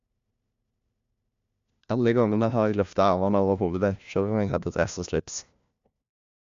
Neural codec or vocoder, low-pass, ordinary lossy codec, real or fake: codec, 16 kHz, 1 kbps, FunCodec, trained on LibriTTS, 50 frames a second; 7.2 kHz; MP3, 64 kbps; fake